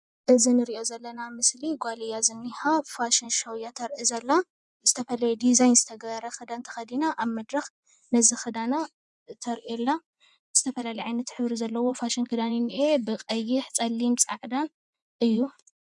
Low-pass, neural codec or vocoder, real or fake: 10.8 kHz; vocoder, 24 kHz, 100 mel bands, Vocos; fake